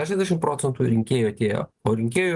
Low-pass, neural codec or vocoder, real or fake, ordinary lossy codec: 10.8 kHz; none; real; Opus, 24 kbps